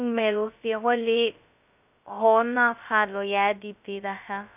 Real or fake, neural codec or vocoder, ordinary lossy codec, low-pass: fake; codec, 16 kHz, 0.2 kbps, FocalCodec; none; 3.6 kHz